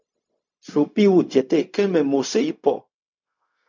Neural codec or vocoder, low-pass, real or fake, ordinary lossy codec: codec, 16 kHz, 0.4 kbps, LongCat-Audio-Codec; 7.2 kHz; fake; MP3, 64 kbps